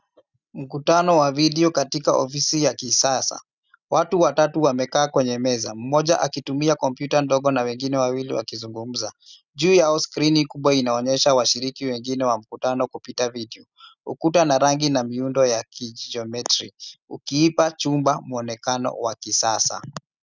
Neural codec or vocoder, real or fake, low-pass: none; real; 7.2 kHz